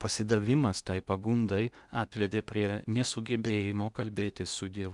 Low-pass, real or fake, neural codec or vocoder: 10.8 kHz; fake; codec, 16 kHz in and 24 kHz out, 0.8 kbps, FocalCodec, streaming, 65536 codes